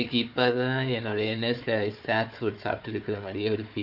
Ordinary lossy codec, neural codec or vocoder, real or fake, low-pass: none; codec, 16 kHz, 4 kbps, FunCodec, trained on Chinese and English, 50 frames a second; fake; 5.4 kHz